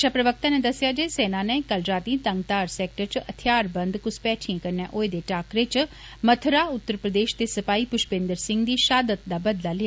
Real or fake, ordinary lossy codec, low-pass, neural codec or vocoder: real; none; none; none